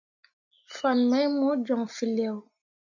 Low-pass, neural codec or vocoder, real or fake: 7.2 kHz; none; real